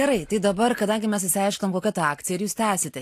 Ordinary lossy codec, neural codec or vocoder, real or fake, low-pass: AAC, 64 kbps; none; real; 14.4 kHz